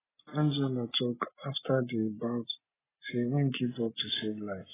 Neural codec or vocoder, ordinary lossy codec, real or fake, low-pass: none; AAC, 16 kbps; real; 3.6 kHz